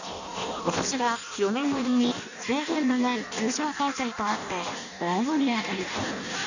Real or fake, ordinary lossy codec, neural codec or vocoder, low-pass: fake; none; codec, 16 kHz in and 24 kHz out, 0.6 kbps, FireRedTTS-2 codec; 7.2 kHz